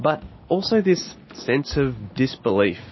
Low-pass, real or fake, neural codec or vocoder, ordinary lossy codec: 7.2 kHz; real; none; MP3, 24 kbps